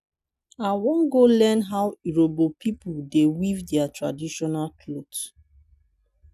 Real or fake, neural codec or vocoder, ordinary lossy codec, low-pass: real; none; none; 14.4 kHz